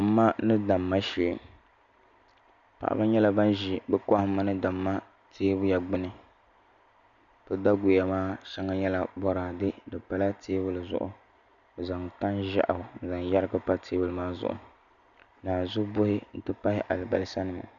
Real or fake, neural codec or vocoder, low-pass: real; none; 7.2 kHz